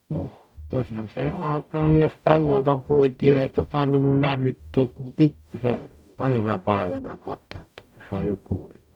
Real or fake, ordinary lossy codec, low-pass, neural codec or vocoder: fake; none; 19.8 kHz; codec, 44.1 kHz, 0.9 kbps, DAC